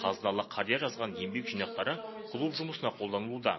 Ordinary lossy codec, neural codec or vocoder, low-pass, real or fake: MP3, 24 kbps; none; 7.2 kHz; real